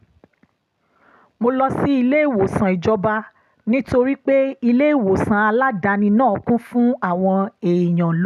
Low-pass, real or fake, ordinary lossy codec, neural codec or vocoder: 14.4 kHz; real; none; none